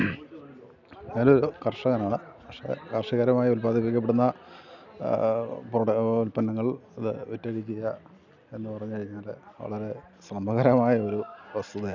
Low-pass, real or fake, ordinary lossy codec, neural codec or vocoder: 7.2 kHz; real; none; none